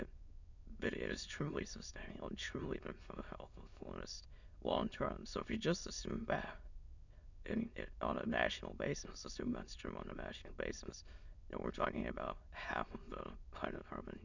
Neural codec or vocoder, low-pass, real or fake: autoencoder, 22.05 kHz, a latent of 192 numbers a frame, VITS, trained on many speakers; 7.2 kHz; fake